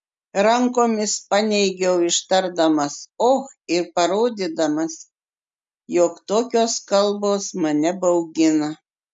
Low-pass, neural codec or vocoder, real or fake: 9.9 kHz; none; real